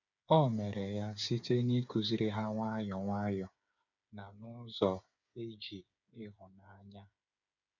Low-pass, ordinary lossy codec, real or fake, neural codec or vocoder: 7.2 kHz; none; fake; codec, 16 kHz, 8 kbps, FreqCodec, smaller model